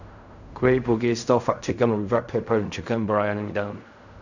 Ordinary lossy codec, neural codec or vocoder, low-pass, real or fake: none; codec, 16 kHz in and 24 kHz out, 0.4 kbps, LongCat-Audio-Codec, fine tuned four codebook decoder; 7.2 kHz; fake